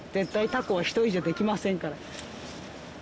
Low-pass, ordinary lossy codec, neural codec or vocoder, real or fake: none; none; none; real